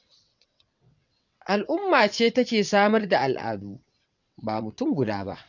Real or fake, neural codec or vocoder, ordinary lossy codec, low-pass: fake; vocoder, 22.05 kHz, 80 mel bands, WaveNeXt; none; 7.2 kHz